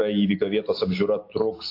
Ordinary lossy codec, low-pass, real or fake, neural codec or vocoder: AAC, 32 kbps; 5.4 kHz; real; none